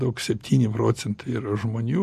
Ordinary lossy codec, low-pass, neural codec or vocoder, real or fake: MP3, 64 kbps; 14.4 kHz; none; real